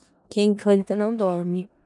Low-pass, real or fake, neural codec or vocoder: 10.8 kHz; fake; codec, 16 kHz in and 24 kHz out, 0.4 kbps, LongCat-Audio-Codec, four codebook decoder